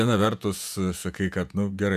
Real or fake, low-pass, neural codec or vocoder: fake; 14.4 kHz; vocoder, 48 kHz, 128 mel bands, Vocos